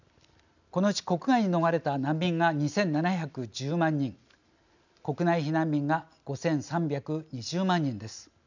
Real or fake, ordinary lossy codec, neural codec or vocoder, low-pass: real; none; none; 7.2 kHz